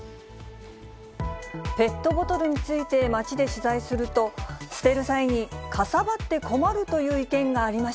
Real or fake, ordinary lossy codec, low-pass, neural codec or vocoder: real; none; none; none